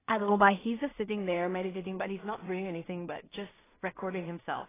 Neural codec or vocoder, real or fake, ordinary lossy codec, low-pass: codec, 16 kHz in and 24 kHz out, 0.4 kbps, LongCat-Audio-Codec, two codebook decoder; fake; AAC, 16 kbps; 3.6 kHz